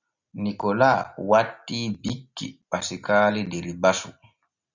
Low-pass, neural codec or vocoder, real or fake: 7.2 kHz; none; real